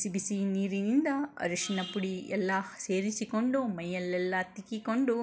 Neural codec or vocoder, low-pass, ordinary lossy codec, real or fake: none; none; none; real